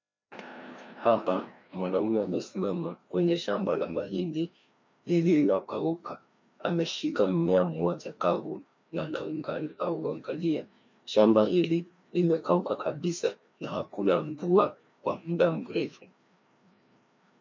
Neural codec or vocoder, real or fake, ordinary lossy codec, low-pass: codec, 16 kHz, 1 kbps, FreqCodec, larger model; fake; MP3, 64 kbps; 7.2 kHz